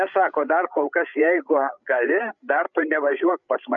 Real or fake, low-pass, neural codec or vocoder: fake; 7.2 kHz; codec, 16 kHz, 16 kbps, FreqCodec, larger model